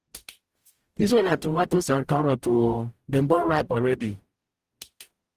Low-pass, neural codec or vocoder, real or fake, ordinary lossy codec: 14.4 kHz; codec, 44.1 kHz, 0.9 kbps, DAC; fake; Opus, 24 kbps